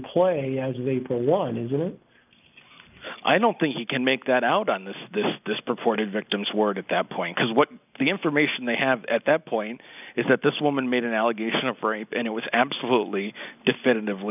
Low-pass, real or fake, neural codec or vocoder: 3.6 kHz; real; none